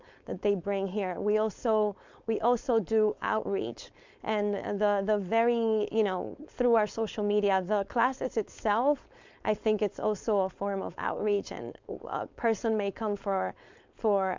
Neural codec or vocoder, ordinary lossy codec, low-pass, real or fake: codec, 16 kHz, 4.8 kbps, FACodec; MP3, 64 kbps; 7.2 kHz; fake